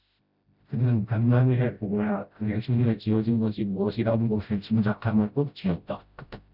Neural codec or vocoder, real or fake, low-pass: codec, 16 kHz, 0.5 kbps, FreqCodec, smaller model; fake; 5.4 kHz